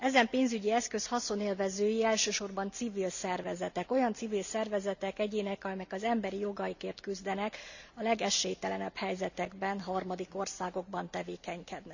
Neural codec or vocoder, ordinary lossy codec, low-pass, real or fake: none; none; 7.2 kHz; real